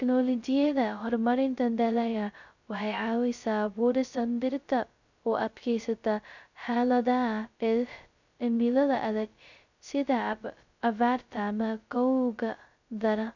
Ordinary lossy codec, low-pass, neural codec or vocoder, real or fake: none; 7.2 kHz; codec, 16 kHz, 0.2 kbps, FocalCodec; fake